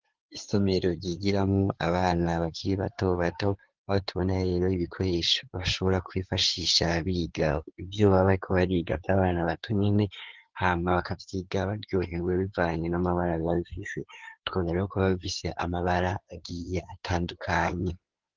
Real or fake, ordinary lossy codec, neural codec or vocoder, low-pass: fake; Opus, 16 kbps; codec, 16 kHz in and 24 kHz out, 2.2 kbps, FireRedTTS-2 codec; 7.2 kHz